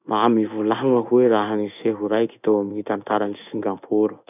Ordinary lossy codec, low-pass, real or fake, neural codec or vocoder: none; 3.6 kHz; fake; codec, 16 kHz in and 24 kHz out, 1 kbps, XY-Tokenizer